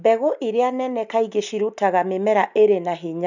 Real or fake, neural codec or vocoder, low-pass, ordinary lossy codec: real; none; 7.2 kHz; none